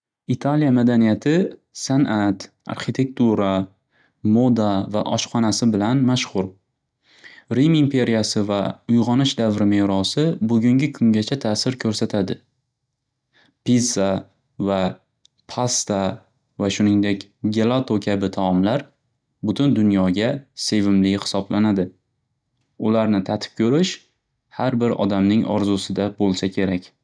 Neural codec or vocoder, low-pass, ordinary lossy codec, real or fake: none; 9.9 kHz; none; real